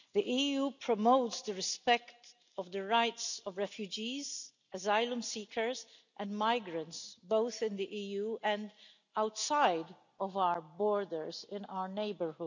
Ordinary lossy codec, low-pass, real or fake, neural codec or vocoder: none; 7.2 kHz; real; none